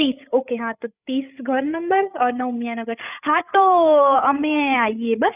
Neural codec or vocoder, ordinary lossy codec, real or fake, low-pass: codec, 16 kHz, 8 kbps, FreqCodec, larger model; none; fake; 3.6 kHz